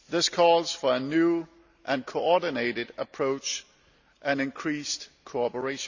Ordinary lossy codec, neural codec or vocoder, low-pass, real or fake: none; none; 7.2 kHz; real